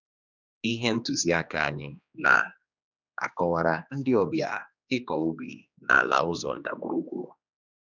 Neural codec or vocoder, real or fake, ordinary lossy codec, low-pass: codec, 16 kHz, 2 kbps, X-Codec, HuBERT features, trained on general audio; fake; none; 7.2 kHz